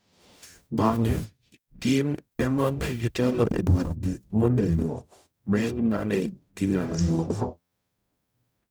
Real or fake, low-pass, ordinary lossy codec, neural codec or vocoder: fake; none; none; codec, 44.1 kHz, 0.9 kbps, DAC